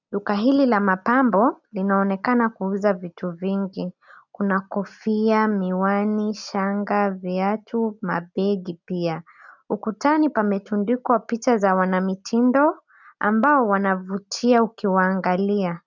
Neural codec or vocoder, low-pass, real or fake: none; 7.2 kHz; real